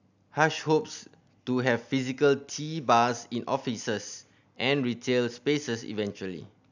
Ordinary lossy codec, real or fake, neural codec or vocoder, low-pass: none; real; none; 7.2 kHz